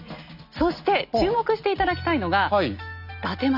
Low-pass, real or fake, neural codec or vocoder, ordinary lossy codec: 5.4 kHz; real; none; none